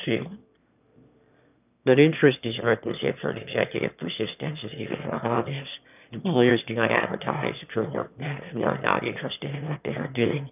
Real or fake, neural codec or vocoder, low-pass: fake; autoencoder, 22.05 kHz, a latent of 192 numbers a frame, VITS, trained on one speaker; 3.6 kHz